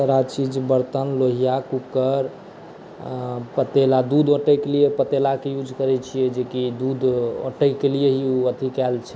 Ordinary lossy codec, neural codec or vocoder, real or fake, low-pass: none; none; real; none